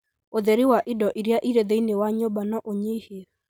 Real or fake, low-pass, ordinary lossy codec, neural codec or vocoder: real; none; none; none